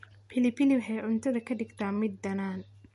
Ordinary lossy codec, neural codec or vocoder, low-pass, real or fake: MP3, 48 kbps; none; 14.4 kHz; real